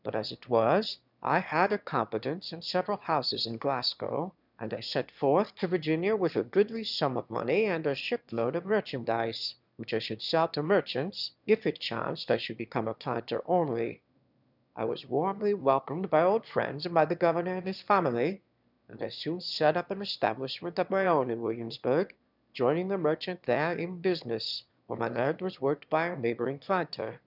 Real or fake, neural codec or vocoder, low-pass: fake; autoencoder, 22.05 kHz, a latent of 192 numbers a frame, VITS, trained on one speaker; 5.4 kHz